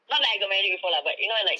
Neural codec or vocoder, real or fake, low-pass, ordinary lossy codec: none; real; none; none